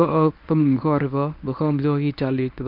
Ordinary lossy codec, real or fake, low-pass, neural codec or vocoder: none; fake; 5.4 kHz; codec, 24 kHz, 0.9 kbps, WavTokenizer, medium speech release version 1